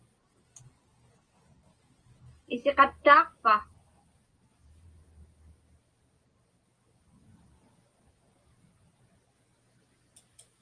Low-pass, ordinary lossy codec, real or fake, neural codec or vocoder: 9.9 kHz; Opus, 24 kbps; real; none